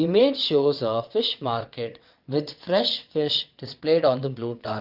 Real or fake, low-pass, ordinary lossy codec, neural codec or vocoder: fake; 5.4 kHz; Opus, 32 kbps; vocoder, 22.05 kHz, 80 mel bands, WaveNeXt